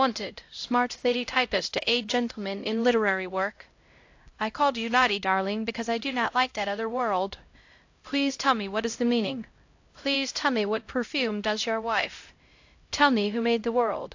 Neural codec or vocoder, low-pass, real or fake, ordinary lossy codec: codec, 16 kHz, 0.5 kbps, X-Codec, HuBERT features, trained on LibriSpeech; 7.2 kHz; fake; AAC, 48 kbps